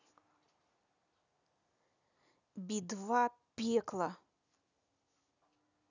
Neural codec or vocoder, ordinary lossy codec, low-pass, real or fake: none; none; 7.2 kHz; real